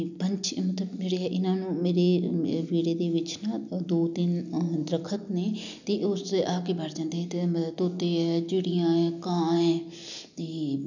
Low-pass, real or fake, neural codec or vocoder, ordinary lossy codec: 7.2 kHz; real; none; none